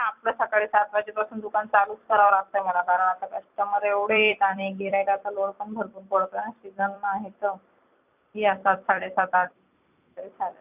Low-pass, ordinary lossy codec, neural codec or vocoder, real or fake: 3.6 kHz; none; vocoder, 44.1 kHz, 128 mel bands every 256 samples, BigVGAN v2; fake